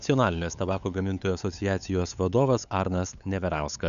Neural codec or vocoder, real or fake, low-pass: codec, 16 kHz, 8 kbps, FunCodec, trained on LibriTTS, 25 frames a second; fake; 7.2 kHz